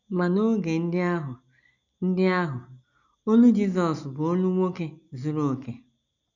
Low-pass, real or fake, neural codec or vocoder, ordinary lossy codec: 7.2 kHz; real; none; none